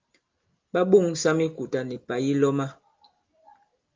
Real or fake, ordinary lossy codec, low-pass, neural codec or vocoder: real; Opus, 32 kbps; 7.2 kHz; none